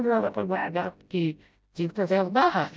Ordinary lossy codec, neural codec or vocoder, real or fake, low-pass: none; codec, 16 kHz, 0.5 kbps, FreqCodec, smaller model; fake; none